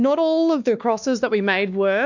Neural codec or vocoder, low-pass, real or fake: codec, 16 kHz, 2 kbps, X-Codec, WavLM features, trained on Multilingual LibriSpeech; 7.2 kHz; fake